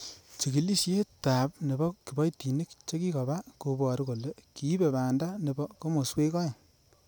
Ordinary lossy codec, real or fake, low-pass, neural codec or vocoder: none; real; none; none